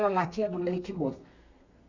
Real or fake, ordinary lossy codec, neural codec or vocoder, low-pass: fake; none; codec, 32 kHz, 1.9 kbps, SNAC; 7.2 kHz